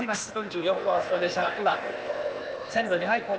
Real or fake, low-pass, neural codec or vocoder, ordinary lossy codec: fake; none; codec, 16 kHz, 0.8 kbps, ZipCodec; none